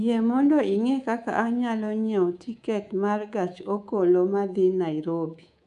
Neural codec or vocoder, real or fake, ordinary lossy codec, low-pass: codec, 24 kHz, 3.1 kbps, DualCodec; fake; none; 10.8 kHz